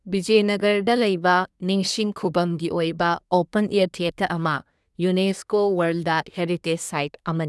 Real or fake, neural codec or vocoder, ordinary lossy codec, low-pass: fake; codec, 24 kHz, 1 kbps, SNAC; none; none